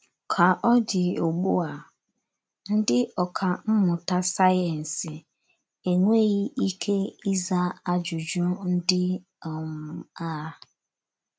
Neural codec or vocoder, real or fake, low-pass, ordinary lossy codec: none; real; none; none